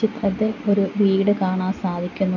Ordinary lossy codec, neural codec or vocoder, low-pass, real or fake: none; none; 7.2 kHz; real